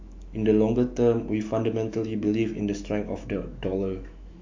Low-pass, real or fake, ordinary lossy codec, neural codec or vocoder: 7.2 kHz; fake; MP3, 48 kbps; autoencoder, 48 kHz, 128 numbers a frame, DAC-VAE, trained on Japanese speech